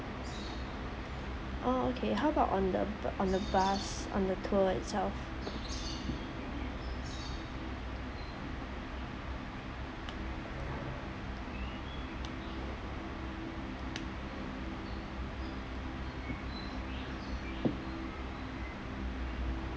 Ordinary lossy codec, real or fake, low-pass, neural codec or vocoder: none; real; none; none